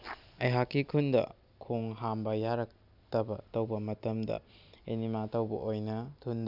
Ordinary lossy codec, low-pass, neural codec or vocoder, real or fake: none; 5.4 kHz; none; real